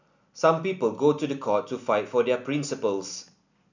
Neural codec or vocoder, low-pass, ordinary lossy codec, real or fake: none; 7.2 kHz; none; real